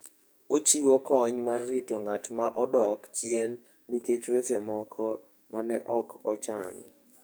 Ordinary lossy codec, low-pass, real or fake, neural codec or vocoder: none; none; fake; codec, 44.1 kHz, 2.6 kbps, SNAC